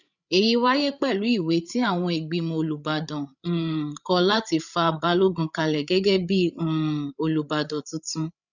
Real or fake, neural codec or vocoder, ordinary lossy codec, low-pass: fake; codec, 16 kHz, 8 kbps, FreqCodec, larger model; none; 7.2 kHz